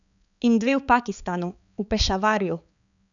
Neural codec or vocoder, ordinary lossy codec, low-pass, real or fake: codec, 16 kHz, 4 kbps, X-Codec, HuBERT features, trained on balanced general audio; none; 7.2 kHz; fake